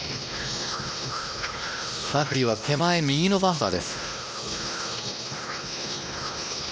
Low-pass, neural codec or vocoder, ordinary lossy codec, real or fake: none; codec, 16 kHz, 1 kbps, X-Codec, WavLM features, trained on Multilingual LibriSpeech; none; fake